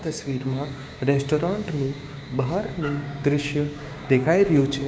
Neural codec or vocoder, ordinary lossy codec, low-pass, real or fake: codec, 16 kHz, 6 kbps, DAC; none; none; fake